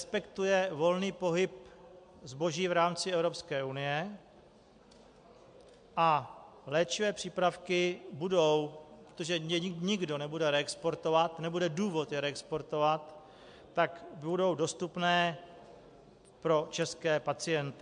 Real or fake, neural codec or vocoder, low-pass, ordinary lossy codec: real; none; 9.9 kHz; MP3, 64 kbps